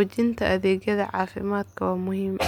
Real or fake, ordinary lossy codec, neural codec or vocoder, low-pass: real; MP3, 96 kbps; none; 19.8 kHz